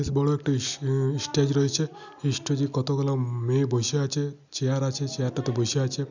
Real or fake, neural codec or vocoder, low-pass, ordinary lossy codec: real; none; 7.2 kHz; none